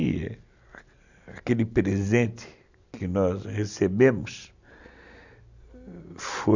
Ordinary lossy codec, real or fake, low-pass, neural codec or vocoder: none; real; 7.2 kHz; none